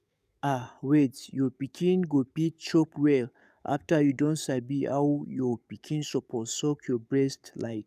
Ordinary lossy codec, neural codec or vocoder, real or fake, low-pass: none; codec, 44.1 kHz, 7.8 kbps, DAC; fake; 14.4 kHz